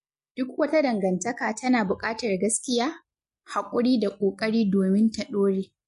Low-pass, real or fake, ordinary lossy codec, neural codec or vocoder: 14.4 kHz; real; MP3, 48 kbps; none